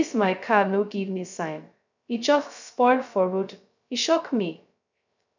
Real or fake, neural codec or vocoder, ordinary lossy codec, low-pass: fake; codec, 16 kHz, 0.2 kbps, FocalCodec; none; 7.2 kHz